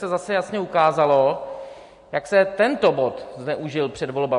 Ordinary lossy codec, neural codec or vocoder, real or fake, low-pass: MP3, 48 kbps; none; real; 14.4 kHz